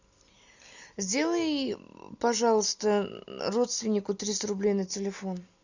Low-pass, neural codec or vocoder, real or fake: 7.2 kHz; none; real